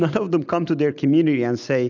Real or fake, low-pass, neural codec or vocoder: real; 7.2 kHz; none